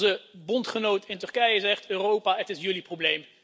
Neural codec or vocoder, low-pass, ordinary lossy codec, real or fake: none; none; none; real